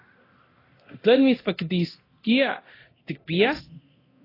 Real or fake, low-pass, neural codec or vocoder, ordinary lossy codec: fake; 5.4 kHz; codec, 24 kHz, 0.9 kbps, DualCodec; AAC, 24 kbps